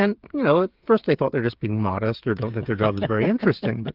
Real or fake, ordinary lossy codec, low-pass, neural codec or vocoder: fake; Opus, 32 kbps; 5.4 kHz; codec, 16 kHz, 8 kbps, FreqCodec, smaller model